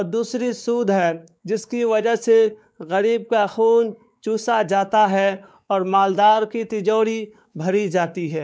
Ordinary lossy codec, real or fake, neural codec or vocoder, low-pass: none; fake; codec, 16 kHz, 4 kbps, X-Codec, WavLM features, trained on Multilingual LibriSpeech; none